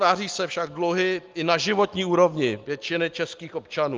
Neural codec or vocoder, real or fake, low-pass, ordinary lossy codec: none; real; 7.2 kHz; Opus, 32 kbps